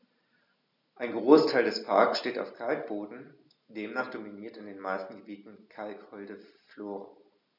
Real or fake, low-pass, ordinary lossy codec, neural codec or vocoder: real; 5.4 kHz; none; none